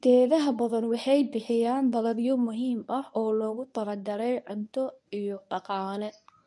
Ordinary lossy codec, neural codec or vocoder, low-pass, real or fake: none; codec, 24 kHz, 0.9 kbps, WavTokenizer, medium speech release version 1; none; fake